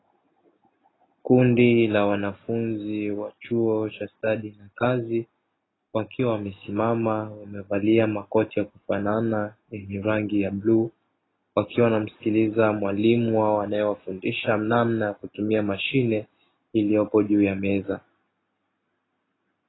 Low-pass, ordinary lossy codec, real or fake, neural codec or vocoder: 7.2 kHz; AAC, 16 kbps; real; none